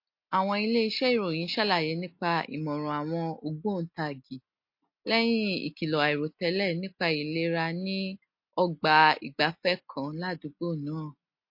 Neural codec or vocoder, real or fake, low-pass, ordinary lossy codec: none; real; 5.4 kHz; MP3, 32 kbps